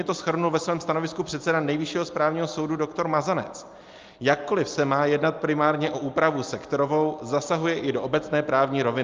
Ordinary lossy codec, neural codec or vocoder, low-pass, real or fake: Opus, 24 kbps; none; 7.2 kHz; real